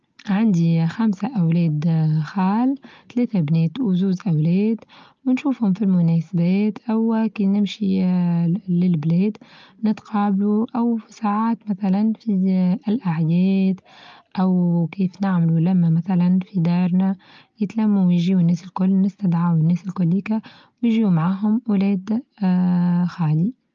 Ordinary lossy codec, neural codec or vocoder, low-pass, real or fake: Opus, 24 kbps; none; 7.2 kHz; real